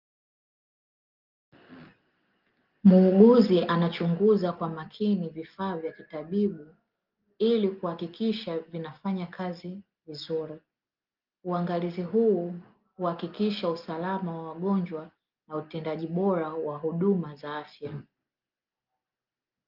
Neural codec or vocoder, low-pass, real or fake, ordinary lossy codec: none; 5.4 kHz; real; Opus, 32 kbps